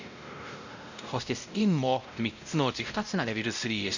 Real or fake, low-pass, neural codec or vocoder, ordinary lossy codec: fake; 7.2 kHz; codec, 16 kHz, 0.5 kbps, X-Codec, WavLM features, trained on Multilingual LibriSpeech; none